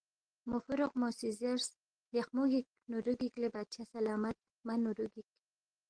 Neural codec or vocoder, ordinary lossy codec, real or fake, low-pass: none; Opus, 16 kbps; real; 9.9 kHz